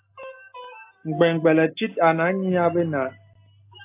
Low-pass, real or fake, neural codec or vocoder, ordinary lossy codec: 3.6 kHz; real; none; AAC, 24 kbps